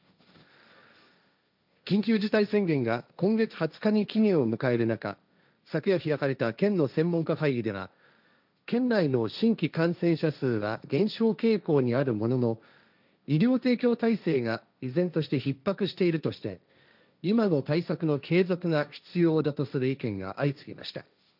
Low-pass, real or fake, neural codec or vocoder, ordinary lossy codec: 5.4 kHz; fake; codec, 16 kHz, 1.1 kbps, Voila-Tokenizer; none